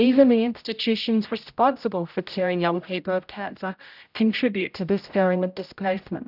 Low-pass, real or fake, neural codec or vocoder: 5.4 kHz; fake; codec, 16 kHz, 0.5 kbps, X-Codec, HuBERT features, trained on general audio